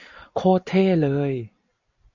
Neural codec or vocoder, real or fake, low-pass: vocoder, 44.1 kHz, 128 mel bands every 256 samples, BigVGAN v2; fake; 7.2 kHz